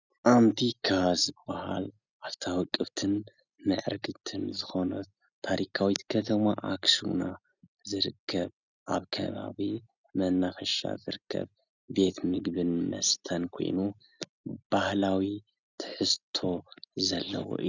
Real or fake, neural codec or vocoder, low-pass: real; none; 7.2 kHz